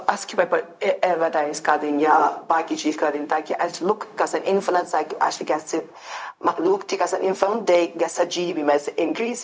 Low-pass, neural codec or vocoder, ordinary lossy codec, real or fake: none; codec, 16 kHz, 0.4 kbps, LongCat-Audio-Codec; none; fake